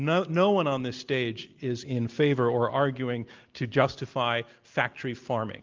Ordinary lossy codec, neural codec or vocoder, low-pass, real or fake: Opus, 24 kbps; none; 7.2 kHz; real